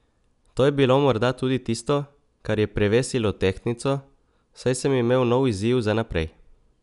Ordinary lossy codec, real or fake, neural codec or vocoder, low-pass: none; real; none; 10.8 kHz